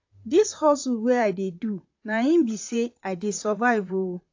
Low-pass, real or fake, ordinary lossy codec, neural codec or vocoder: 7.2 kHz; fake; AAC, 48 kbps; vocoder, 44.1 kHz, 128 mel bands, Pupu-Vocoder